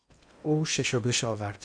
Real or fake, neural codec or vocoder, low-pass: fake; codec, 16 kHz in and 24 kHz out, 0.8 kbps, FocalCodec, streaming, 65536 codes; 9.9 kHz